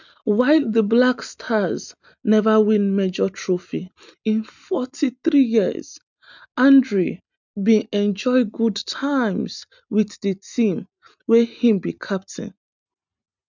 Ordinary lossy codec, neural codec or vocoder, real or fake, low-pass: none; none; real; 7.2 kHz